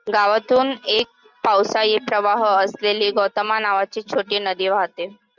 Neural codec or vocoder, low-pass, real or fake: none; 7.2 kHz; real